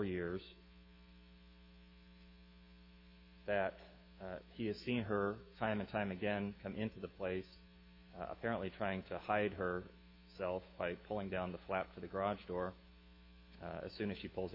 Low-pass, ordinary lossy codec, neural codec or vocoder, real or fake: 5.4 kHz; MP3, 32 kbps; autoencoder, 48 kHz, 128 numbers a frame, DAC-VAE, trained on Japanese speech; fake